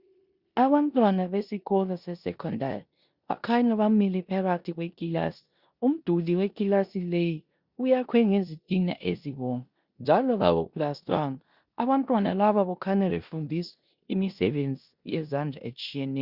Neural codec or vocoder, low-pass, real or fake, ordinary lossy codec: codec, 16 kHz in and 24 kHz out, 0.9 kbps, LongCat-Audio-Codec, four codebook decoder; 5.4 kHz; fake; Opus, 64 kbps